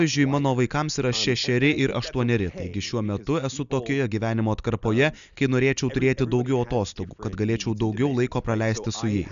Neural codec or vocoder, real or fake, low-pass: none; real; 7.2 kHz